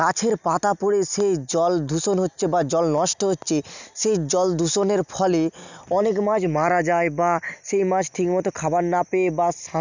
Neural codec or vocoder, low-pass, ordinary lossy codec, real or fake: none; 7.2 kHz; none; real